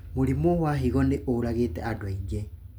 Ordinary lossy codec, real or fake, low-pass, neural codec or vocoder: none; real; none; none